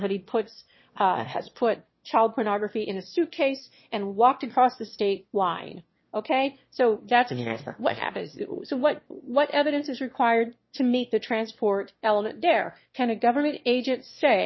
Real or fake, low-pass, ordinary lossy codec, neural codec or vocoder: fake; 7.2 kHz; MP3, 24 kbps; autoencoder, 22.05 kHz, a latent of 192 numbers a frame, VITS, trained on one speaker